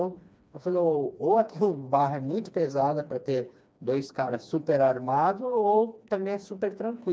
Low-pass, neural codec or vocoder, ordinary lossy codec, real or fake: none; codec, 16 kHz, 2 kbps, FreqCodec, smaller model; none; fake